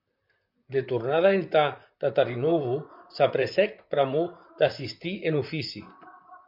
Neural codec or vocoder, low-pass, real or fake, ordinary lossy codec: vocoder, 44.1 kHz, 128 mel bands, Pupu-Vocoder; 5.4 kHz; fake; MP3, 48 kbps